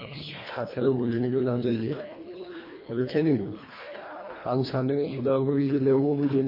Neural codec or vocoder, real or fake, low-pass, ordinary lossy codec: codec, 24 kHz, 1.5 kbps, HILCodec; fake; 5.4 kHz; MP3, 24 kbps